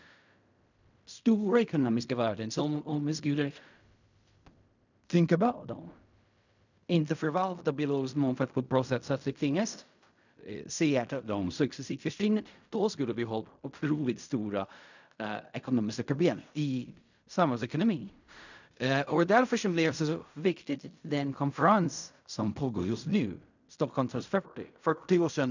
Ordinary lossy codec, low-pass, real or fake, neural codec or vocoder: none; 7.2 kHz; fake; codec, 16 kHz in and 24 kHz out, 0.4 kbps, LongCat-Audio-Codec, fine tuned four codebook decoder